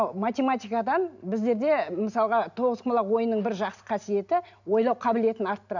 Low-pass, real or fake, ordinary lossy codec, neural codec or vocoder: 7.2 kHz; real; none; none